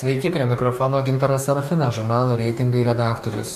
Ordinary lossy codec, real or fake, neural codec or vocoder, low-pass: MP3, 96 kbps; fake; codec, 44.1 kHz, 2.6 kbps, DAC; 14.4 kHz